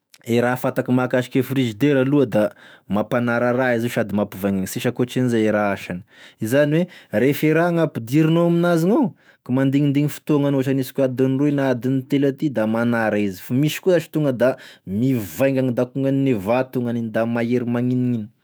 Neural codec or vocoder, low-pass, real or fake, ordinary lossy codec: autoencoder, 48 kHz, 128 numbers a frame, DAC-VAE, trained on Japanese speech; none; fake; none